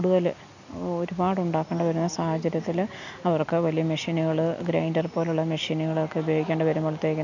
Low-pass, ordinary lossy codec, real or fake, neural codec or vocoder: 7.2 kHz; none; real; none